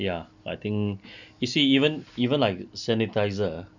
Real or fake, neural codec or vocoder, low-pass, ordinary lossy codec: real; none; 7.2 kHz; none